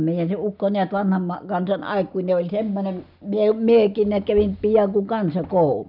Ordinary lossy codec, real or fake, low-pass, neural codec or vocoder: none; real; 5.4 kHz; none